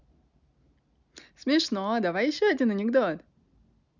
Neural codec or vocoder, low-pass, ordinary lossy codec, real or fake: none; 7.2 kHz; none; real